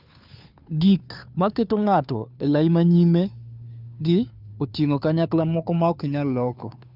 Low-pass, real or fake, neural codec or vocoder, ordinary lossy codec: 5.4 kHz; fake; codec, 16 kHz, 2 kbps, FunCodec, trained on Chinese and English, 25 frames a second; none